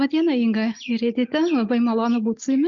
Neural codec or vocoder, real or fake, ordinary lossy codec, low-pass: none; real; Opus, 64 kbps; 7.2 kHz